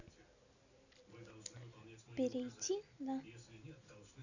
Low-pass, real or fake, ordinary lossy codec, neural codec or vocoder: 7.2 kHz; real; none; none